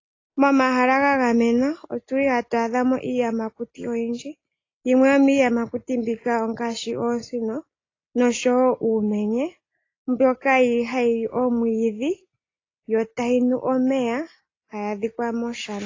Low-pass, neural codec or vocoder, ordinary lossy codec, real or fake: 7.2 kHz; none; AAC, 32 kbps; real